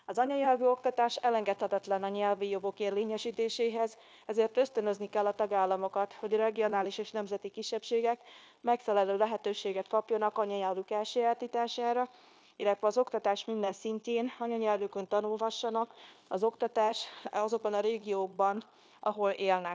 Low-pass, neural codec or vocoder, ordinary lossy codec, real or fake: none; codec, 16 kHz, 0.9 kbps, LongCat-Audio-Codec; none; fake